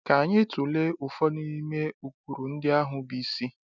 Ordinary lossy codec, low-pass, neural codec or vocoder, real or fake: none; 7.2 kHz; none; real